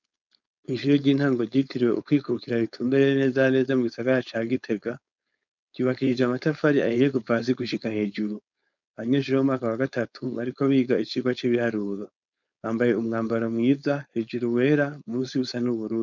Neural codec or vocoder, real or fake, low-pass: codec, 16 kHz, 4.8 kbps, FACodec; fake; 7.2 kHz